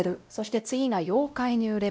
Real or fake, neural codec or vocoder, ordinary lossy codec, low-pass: fake; codec, 16 kHz, 0.5 kbps, X-Codec, WavLM features, trained on Multilingual LibriSpeech; none; none